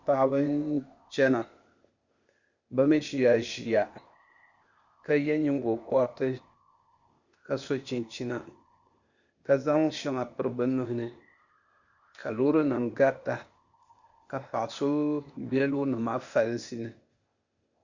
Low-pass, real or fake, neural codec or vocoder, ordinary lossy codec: 7.2 kHz; fake; codec, 16 kHz, 0.8 kbps, ZipCodec; Opus, 64 kbps